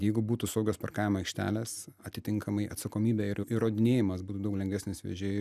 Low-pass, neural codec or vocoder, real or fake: 14.4 kHz; none; real